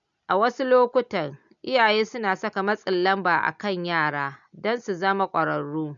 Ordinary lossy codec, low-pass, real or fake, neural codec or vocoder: none; 7.2 kHz; real; none